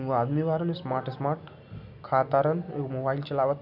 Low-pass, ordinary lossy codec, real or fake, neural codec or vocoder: 5.4 kHz; none; real; none